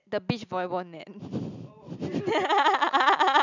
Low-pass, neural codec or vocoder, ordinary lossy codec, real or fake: 7.2 kHz; none; none; real